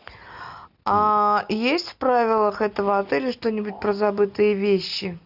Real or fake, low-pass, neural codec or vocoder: real; 5.4 kHz; none